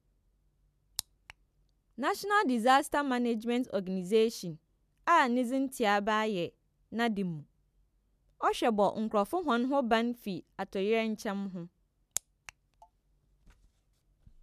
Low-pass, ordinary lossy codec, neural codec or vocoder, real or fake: 14.4 kHz; none; none; real